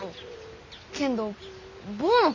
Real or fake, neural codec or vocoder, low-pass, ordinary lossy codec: real; none; 7.2 kHz; MP3, 48 kbps